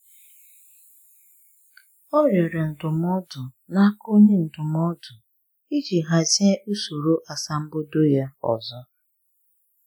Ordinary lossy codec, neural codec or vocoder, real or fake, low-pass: none; none; real; none